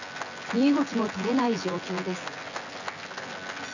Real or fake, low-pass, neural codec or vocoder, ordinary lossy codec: fake; 7.2 kHz; vocoder, 24 kHz, 100 mel bands, Vocos; none